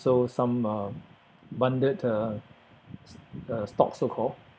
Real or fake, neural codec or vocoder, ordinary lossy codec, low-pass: real; none; none; none